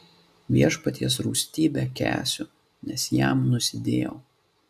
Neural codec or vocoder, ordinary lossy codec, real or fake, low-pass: none; AAC, 96 kbps; real; 14.4 kHz